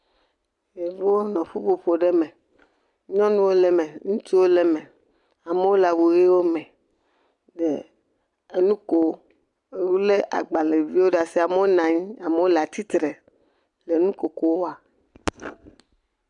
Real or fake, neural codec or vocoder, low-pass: real; none; 10.8 kHz